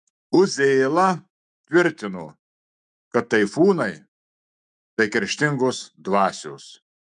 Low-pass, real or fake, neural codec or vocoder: 10.8 kHz; fake; autoencoder, 48 kHz, 128 numbers a frame, DAC-VAE, trained on Japanese speech